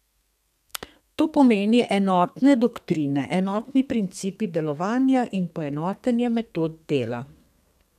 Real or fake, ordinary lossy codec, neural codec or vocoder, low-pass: fake; none; codec, 32 kHz, 1.9 kbps, SNAC; 14.4 kHz